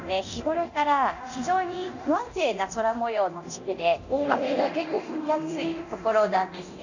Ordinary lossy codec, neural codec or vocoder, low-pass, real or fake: none; codec, 24 kHz, 0.9 kbps, DualCodec; 7.2 kHz; fake